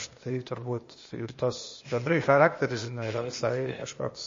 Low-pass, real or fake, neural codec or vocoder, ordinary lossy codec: 7.2 kHz; fake; codec, 16 kHz, 0.8 kbps, ZipCodec; MP3, 32 kbps